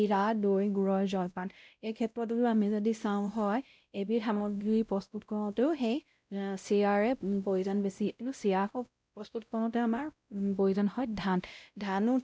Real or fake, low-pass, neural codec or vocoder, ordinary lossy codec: fake; none; codec, 16 kHz, 0.5 kbps, X-Codec, WavLM features, trained on Multilingual LibriSpeech; none